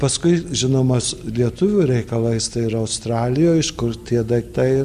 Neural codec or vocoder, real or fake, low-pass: none; real; 14.4 kHz